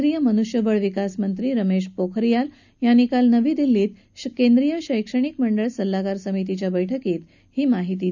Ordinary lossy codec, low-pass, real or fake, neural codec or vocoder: none; 7.2 kHz; real; none